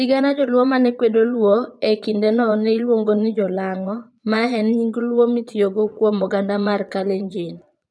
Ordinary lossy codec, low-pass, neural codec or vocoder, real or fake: none; none; vocoder, 22.05 kHz, 80 mel bands, Vocos; fake